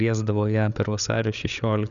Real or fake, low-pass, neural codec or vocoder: fake; 7.2 kHz; codec, 16 kHz, 8 kbps, FreqCodec, larger model